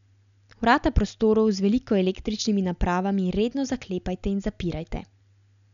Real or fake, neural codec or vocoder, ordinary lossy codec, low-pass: real; none; none; 7.2 kHz